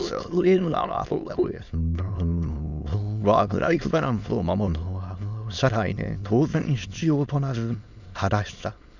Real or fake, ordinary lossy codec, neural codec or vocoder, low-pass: fake; none; autoencoder, 22.05 kHz, a latent of 192 numbers a frame, VITS, trained on many speakers; 7.2 kHz